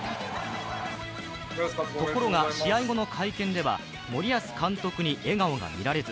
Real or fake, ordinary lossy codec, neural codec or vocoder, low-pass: real; none; none; none